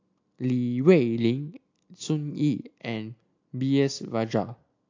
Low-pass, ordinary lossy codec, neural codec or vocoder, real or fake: 7.2 kHz; AAC, 48 kbps; none; real